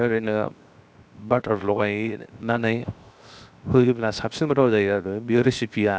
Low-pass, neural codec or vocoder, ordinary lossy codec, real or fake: none; codec, 16 kHz, 0.7 kbps, FocalCodec; none; fake